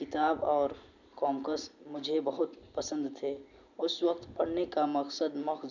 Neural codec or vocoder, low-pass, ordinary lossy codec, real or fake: none; 7.2 kHz; none; real